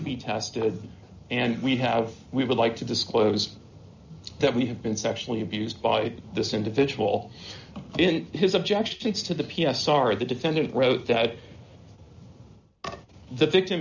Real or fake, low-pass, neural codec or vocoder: real; 7.2 kHz; none